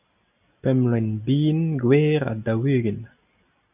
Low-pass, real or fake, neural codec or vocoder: 3.6 kHz; real; none